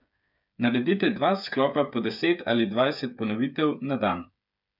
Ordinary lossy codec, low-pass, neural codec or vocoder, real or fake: none; 5.4 kHz; codec, 16 kHz, 8 kbps, FreqCodec, smaller model; fake